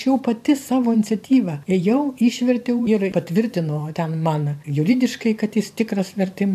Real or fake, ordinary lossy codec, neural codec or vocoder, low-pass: fake; AAC, 96 kbps; vocoder, 44.1 kHz, 128 mel bands every 512 samples, BigVGAN v2; 14.4 kHz